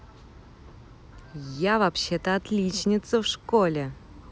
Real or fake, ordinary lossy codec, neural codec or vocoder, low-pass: real; none; none; none